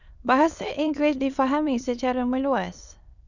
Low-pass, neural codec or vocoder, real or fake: 7.2 kHz; autoencoder, 22.05 kHz, a latent of 192 numbers a frame, VITS, trained on many speakers; fake